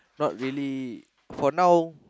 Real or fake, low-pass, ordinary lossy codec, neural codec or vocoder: real; none; none; none